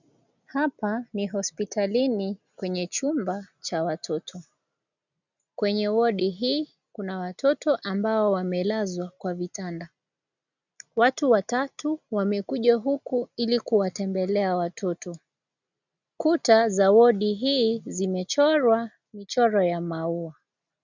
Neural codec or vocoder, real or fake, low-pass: none; real; 7.2 kHz